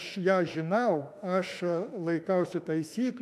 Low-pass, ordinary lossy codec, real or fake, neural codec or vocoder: 14.4 kHz; AAC, 96 kbps; fake; autoencoder, 48 kHz, 32 numbers a frame, DAC-VAE, trained on Japanese speech